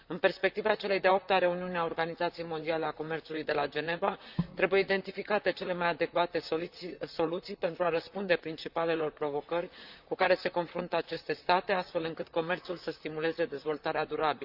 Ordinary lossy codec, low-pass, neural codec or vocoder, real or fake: Opus, 64 kbps; 5.4 kHz; vocoder, 44.1 kHz, 128 mel bands, Pupu-Vocoder; fake